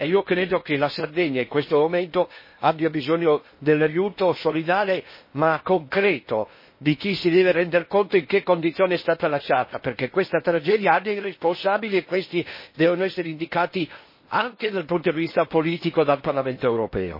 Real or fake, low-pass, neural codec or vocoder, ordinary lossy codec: fake; 5.4 kHz; codec, 16 kHz in and 24 kHz out, 0.8 kbps, FocalCodec, streaming, 65536 codes; MP3, 24 kbps